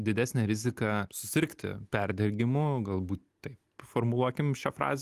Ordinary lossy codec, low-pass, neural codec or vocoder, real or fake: Opus, 24 kbps; 14.4 kHz; none; real